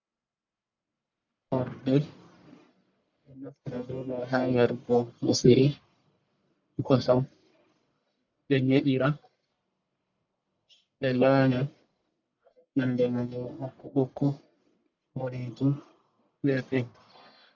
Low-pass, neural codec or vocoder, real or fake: 7.2 kHz; codec, 44.1 kHz, 1.7 kbps, Pupu-Codec; fake